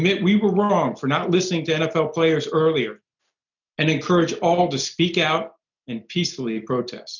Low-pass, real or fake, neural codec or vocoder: 7.2 kHz; real; none